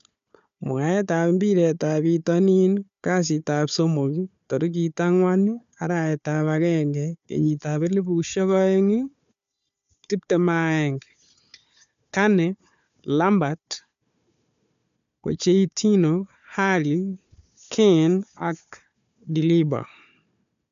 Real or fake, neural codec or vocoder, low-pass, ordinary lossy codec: fake; codec, 16 kHz, 4 kbps, FunCodec, trained on Chinese and English, 50 frames a second; 7.2 kHz; MP3, 64 kbps